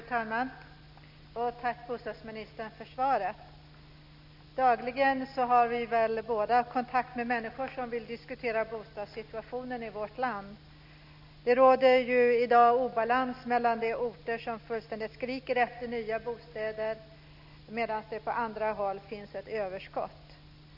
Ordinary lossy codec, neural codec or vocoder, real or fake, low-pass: none; none; real; 5.4 kHz